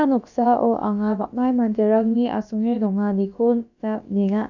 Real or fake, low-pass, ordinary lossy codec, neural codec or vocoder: fake; 7.2 kHz; none; codec, 16 kHz, about 1 kbps, DyCAST, with the encoder's durations